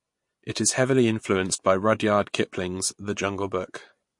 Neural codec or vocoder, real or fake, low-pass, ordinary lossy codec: vocoder, 24 kHz, 100 mel bands, Vocos; fake; 10.8 kHz; MP3, 48 kbps